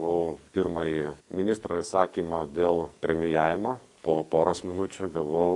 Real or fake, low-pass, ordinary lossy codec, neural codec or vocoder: fake; 10.8 kHz; AAC, 64 kbps; codec, 44.1 kHz, 2.6 kbps, SNAC